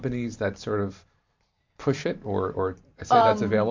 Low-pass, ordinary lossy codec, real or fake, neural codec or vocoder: 7.2 kHz; MP3, 48 kbps; fake; vocoder, 44.1 kHz, 128 mel bands every 512 samples, BigVGAN v2